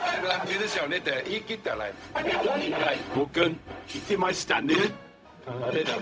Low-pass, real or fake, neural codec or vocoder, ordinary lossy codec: none; fake; codec, 16 kHz, 0.4 kbps, LongCat-Audio-Codec; none